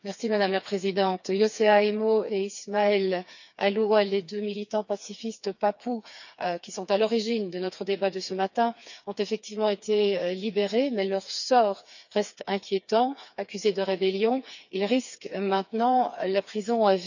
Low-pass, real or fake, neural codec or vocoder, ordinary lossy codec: 7.2 kHz; fake; codec, 16 kHz, 4 kbps, FreqCodec, smaller model; none